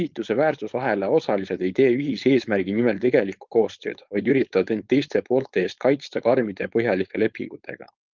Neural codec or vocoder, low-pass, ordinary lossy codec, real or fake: codec, 16 kHz, 4.8 kbps, FACodec; 7.2 kHz; Opus, 24 kbps; fake